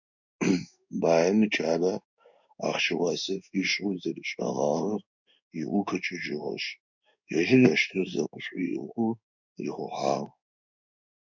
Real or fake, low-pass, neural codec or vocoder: fake; 7.2 kHz; codec, 16 kHz in and 24 kHz out, 1 kbps, XY-Tokenizer